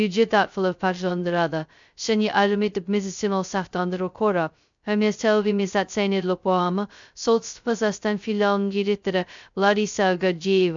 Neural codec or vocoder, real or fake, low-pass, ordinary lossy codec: codec, 16 kHz, 0.2 kbps, FocalCodec; fake; 7.2 kHz; MP3, 64 kbps